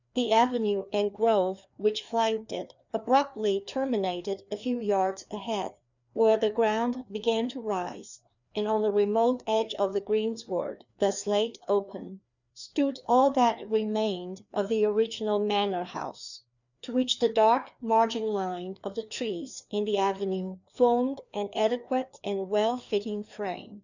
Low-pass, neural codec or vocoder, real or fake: 7.2 kHz; codec, 16 kHz, 2 kbps, FreqCodec, larger model; fake